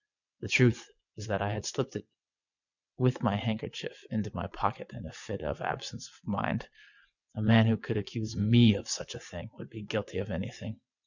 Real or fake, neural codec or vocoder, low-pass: fake; vocoder, 22.05 kHz, 80 mel bands, WaveNeXt; 7.2 kHz